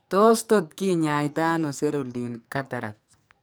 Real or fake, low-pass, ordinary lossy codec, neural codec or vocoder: fake; none; none; codec, 44.1 kHz, 2.6 kbps, SNAC